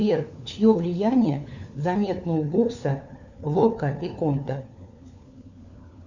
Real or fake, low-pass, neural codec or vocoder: fake; 7.2 kHz; codec, 16 kHz, 4 kbps, FunCodec, trained on LibriTTS, 50 frames a second